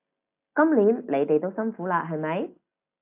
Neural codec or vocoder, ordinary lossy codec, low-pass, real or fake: none; AAC, 32 kbps; 3.6 kHz; real